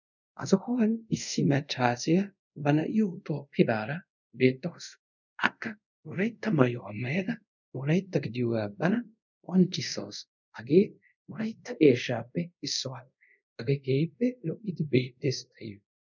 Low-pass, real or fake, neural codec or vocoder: 7.2 kHz; fake; codec, 24 kHz, 0.5 kbps, DualCodec